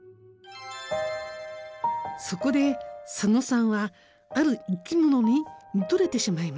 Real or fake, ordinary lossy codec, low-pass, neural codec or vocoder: real; none; none; none